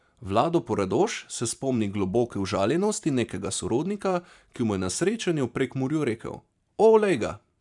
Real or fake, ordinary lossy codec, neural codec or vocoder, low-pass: fake; none; vocoder, 44.1 kHz, 128 mel bands every 512 samples, BigVGAN v2; 10.8 kHz